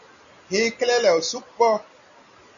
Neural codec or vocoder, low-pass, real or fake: none; 7.2 kHz; real